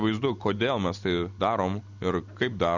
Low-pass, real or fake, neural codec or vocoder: 7.2 kHz; real; none